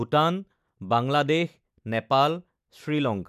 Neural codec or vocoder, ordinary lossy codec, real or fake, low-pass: none; none; real; 14.4 kHz